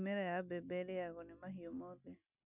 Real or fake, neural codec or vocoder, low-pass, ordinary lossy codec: real; none; 3.6 kHz; none